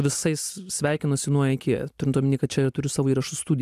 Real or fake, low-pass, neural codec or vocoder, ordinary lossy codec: real; 14.4 kHz; none; AAC, 96 kbps